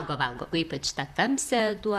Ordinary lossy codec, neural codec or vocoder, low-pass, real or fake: Opus, 64 kbps; vocoder, 44.1 kHz, 128 mel bands, Pupu-Vocoder; 14.4 kHz; fake